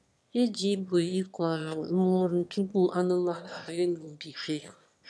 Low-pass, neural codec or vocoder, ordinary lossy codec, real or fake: none; autoencoder, 22.05 kHz, a latent of 192 numbers a frame, VITS, trained on one speaker; none; fake